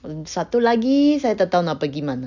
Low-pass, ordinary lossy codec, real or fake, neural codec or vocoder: 7.2 kHz; none; real; none